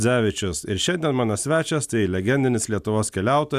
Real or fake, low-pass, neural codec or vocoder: real; 14.4 kHz; none